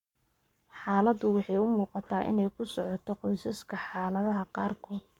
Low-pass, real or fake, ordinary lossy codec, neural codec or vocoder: 19.8 kHz; fake; MP3, 96 kbps; codec, 44.1 kHz, 7.8 kbps, Pupu-Codec